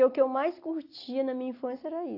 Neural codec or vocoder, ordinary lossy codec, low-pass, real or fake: none; AAC, 32 kbps; 5.4 kHz; real